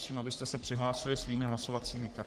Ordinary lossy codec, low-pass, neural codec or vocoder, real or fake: Opus, 24 kbps; 14.4 kHz; codec, 44.1 kHz, 3.4 kbps, Pupu-Codec; fake